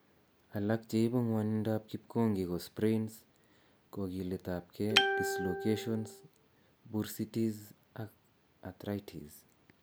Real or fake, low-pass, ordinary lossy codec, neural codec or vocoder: real; none; none; none